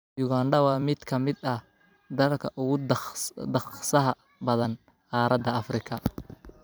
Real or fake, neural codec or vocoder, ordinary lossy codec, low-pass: fake; vocoder, 44.1 kHz, 128 mel bands every 256 samples, BigVGAN v2; none; none